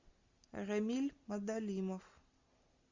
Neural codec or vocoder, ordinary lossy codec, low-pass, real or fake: none; Opus, 64 kbps; 7.2 kHz; real